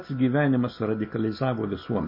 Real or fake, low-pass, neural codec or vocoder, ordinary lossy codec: real; 5.4 kHz; none; MP3, 24 kbps